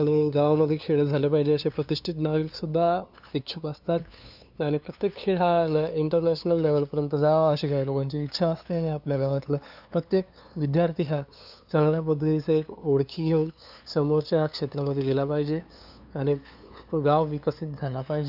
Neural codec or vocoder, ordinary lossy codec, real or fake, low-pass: codec, 16 kHz, 2 kbps, FunCodec, trained on LibriTTS, 25 frames a second; none; fake; 5.4 kHz